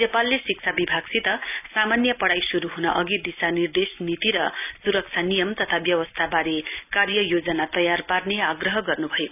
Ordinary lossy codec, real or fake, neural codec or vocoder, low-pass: none; real; none; 3.6 kHz